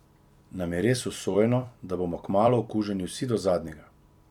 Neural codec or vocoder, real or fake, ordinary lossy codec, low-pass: vocoder, 44.1 kHz, 128 mel bands every 256 samples, BigVGAN v2; fake; none; 19.8 kHz